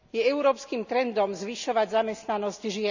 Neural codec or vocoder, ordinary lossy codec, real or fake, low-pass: none; none; real; 7.2 kHz